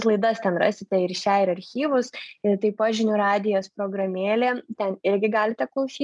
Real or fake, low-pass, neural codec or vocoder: real; 10.8 kHz; none